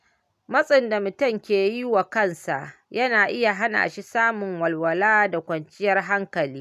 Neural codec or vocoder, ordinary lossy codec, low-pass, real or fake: none; none; 14.4 kHz; real